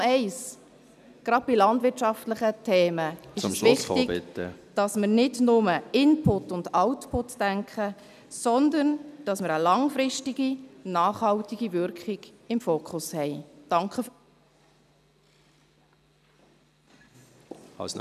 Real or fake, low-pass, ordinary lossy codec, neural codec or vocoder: real; 14.4 kHz; none; none